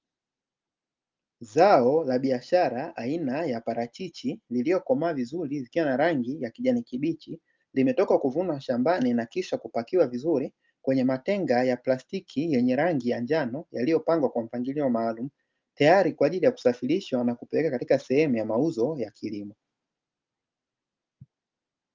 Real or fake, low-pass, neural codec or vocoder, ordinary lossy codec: real; 7.2 kHz; none; Opus, 24 kbps